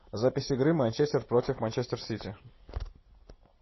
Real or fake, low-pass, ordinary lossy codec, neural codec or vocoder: real; 7.2 kHz; MP3, 24 kbps; none